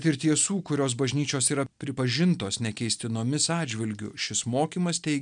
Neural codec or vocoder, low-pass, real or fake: none; 9.9 kHz; real